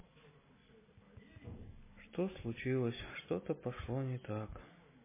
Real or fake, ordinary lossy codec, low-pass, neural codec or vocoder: real; MP3, 16 kbps; 3.6 kHz; none